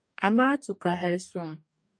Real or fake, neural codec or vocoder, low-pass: fake; codec, 44.1 kHz, 2.6 kbps, DAC; 9.9 kHz